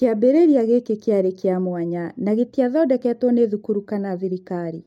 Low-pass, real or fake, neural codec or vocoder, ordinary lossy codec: 19.8 kHz; real; none; MP3, 64 kbps